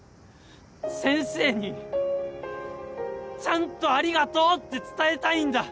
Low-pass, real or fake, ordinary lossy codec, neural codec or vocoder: none; real; none; none